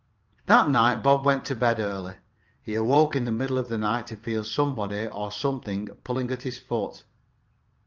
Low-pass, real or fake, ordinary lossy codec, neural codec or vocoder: 7.2 kHz; fake; Opus, 24 kbps; vocoder, 22.05 kHz, 80 mel bands, WaveNeXt